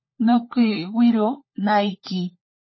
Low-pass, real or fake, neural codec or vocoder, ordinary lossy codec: 7.2 kHz; fake; codec, 16 kHz, 16 kbps, FunCodec, trained on LibriTTS, 50 frames a second; MP3, 24 kbps